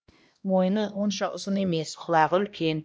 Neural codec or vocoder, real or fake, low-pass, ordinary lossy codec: codec, 16 kHz, 1 kbps, X-Codec, HuBERT features, trained on LibriSpeech; fake; none; none